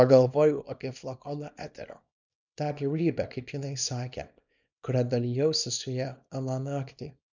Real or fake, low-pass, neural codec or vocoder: fake; 7.2 kHz; codec, 24 kHz, 0.9 kbps, WavTokenizer, small release